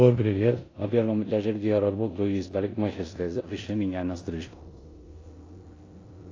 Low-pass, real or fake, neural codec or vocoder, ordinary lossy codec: 7.2 kHz; fake; codec, 16 kHz in and 24 kHz out, 0.9 kbps, LongCat-Audio-Codec, four codebook decoder; AAC, 32 kbps